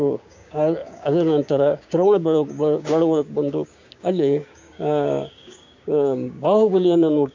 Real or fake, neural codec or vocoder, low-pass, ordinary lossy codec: fake; codec, 16 kHz, 6 kbps, DAC; 7.2 kHz; MP3, 64 kbps